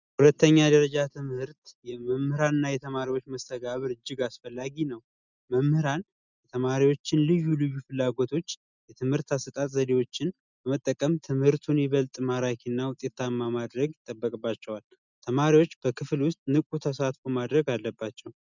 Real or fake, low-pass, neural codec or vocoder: real; 7.2 kHz; none